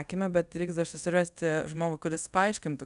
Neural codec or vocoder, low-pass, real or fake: codec, 24 kHz, 0.5 kbps, DualCodec; 10.8 kHz; fake